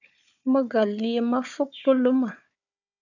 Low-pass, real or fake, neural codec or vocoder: 7.2 kHz; fake; codec, 16 kHz, 4 kbps, FunCodec, trained on Chinese and English, 50 frames a second